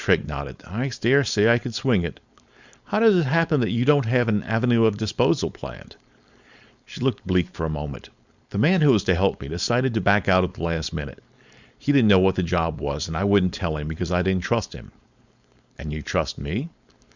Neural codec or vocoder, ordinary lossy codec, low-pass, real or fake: codec, 16 kHz, 4.8 kbps, FACodec; Opus, 64 kbps; 7.2 kHz; fake